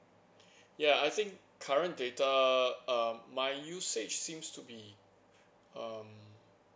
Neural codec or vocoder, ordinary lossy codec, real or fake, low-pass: none; none; real; none